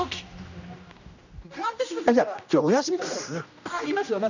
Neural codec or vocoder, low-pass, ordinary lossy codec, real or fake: codec, 16 kHz, 1 kbps, X-Codec, HuBERT features, trained on general audio; 7.2 kHz; none; fake